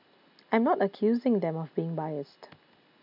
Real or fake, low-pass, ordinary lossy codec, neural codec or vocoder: real; 5.4 kHz; none; none